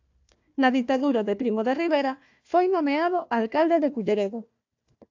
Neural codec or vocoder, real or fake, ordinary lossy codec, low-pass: codec, 16 kHz, 1 kbps, FunCodec, trained on Chinese and English, 50 frames a second; fake; AAC, 48 kbps; 7.2 kHz